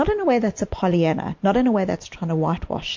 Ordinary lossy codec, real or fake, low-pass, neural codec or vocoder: MP3, 48 kbps; real; 7.2 kHz; none